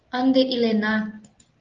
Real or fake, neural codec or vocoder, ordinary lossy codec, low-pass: real; none; Opus, 32 kbps; 7.2 kHz